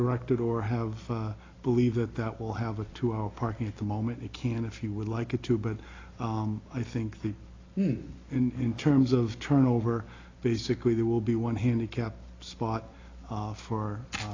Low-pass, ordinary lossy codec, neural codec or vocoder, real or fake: 7.2 kHz; AAC, 32 kbps; none; real